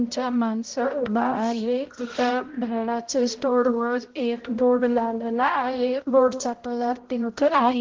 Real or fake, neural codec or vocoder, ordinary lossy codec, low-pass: fake; codec, 16 kHz, 0.5 kbps, X-Codec, HuBERT features, trained on balanced general audio; Opus, 16 kbps; 7.2 kHz